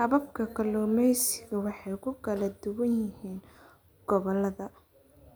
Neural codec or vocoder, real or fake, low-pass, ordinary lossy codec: none; real; none; none